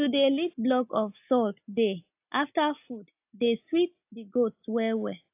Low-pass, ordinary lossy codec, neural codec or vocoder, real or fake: 3.6 kHz; AAC, 32 kbps; none; real